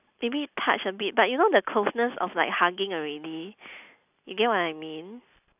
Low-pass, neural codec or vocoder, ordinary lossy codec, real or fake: 3.6 kHz; none; none; real